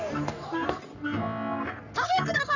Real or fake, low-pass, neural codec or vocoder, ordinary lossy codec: fake; 7.2 kHz; codec, 44.1 kHz, 3.4 kbps, Pupu-Codec; none